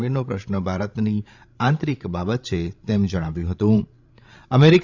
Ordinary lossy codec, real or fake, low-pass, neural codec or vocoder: none; fake; 7.2 kHz; codec, 16 kHz, 16 kbps, FreqCodec, larger model